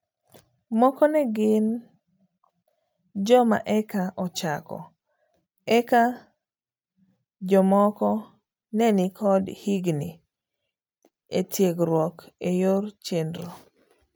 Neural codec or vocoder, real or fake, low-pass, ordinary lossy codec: none; real; none; none